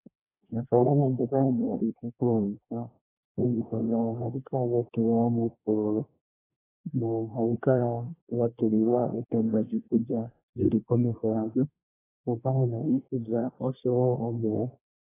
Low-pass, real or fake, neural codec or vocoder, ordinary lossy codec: 3.6 kHz; fake; codec, 24 kHz, 1 kbps, SNAC; AAC, 16 kbps